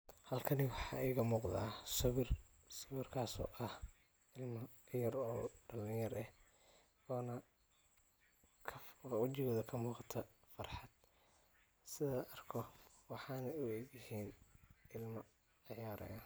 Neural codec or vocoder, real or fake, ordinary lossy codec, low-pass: none; real; none; none